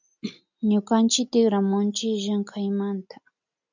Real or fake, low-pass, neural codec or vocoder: real; 7.2 kHz; none